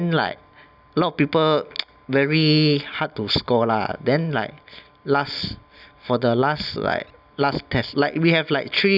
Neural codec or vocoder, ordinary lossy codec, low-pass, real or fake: none; none; 5.4 kHz; real